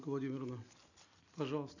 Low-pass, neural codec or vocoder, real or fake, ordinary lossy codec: 7.2 kHz; none; real; none